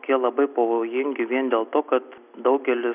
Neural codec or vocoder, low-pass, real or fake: none; 3.6 kHz; real